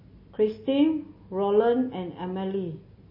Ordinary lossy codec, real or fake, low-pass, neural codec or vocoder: MP3, 24 kbps; real; 5.4 kHz; none